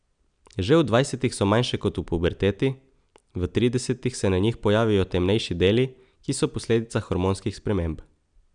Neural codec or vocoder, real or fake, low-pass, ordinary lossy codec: none; real; 9.9 kHz; none